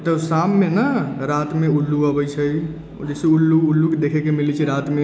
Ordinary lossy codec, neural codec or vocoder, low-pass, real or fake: none; none; none; real